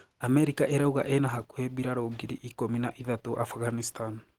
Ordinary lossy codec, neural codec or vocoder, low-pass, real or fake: Opus, 16 kbps; none; 19.8 kHz; real